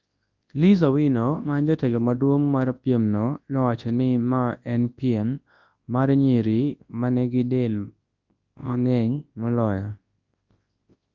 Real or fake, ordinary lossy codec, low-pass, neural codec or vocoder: fake; Opus, 32 kbps; 7.2 kHz; codec, 24 kHz, 0.9 kbps, WavTokenizer, large speech release